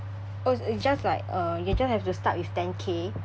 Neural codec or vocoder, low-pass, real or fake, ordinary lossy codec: none; none; real; none